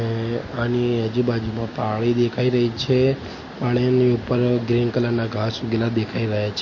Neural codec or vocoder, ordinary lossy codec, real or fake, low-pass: none; MP3, 32 kbps; real; 7.2 kHz